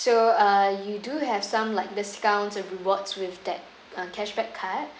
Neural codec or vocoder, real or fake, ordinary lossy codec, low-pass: none; real; none; none